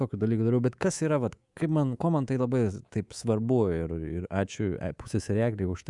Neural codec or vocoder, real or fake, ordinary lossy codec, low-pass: codec, 24 kHz, 3.1 kbps, DualCodec; fake; Opus, 64 kbps; 10.8 kHz